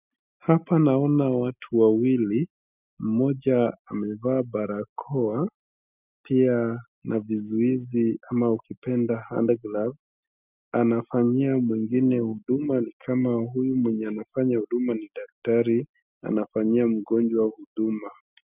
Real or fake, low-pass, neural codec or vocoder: real; 3.6 kHz; none